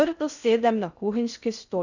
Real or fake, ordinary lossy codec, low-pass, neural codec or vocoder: fake; none; 7.2 kHz; codec, 16 kHz in and 24 kHz out, 0.6 kbps, FocalCodec, streaming, 4096 codes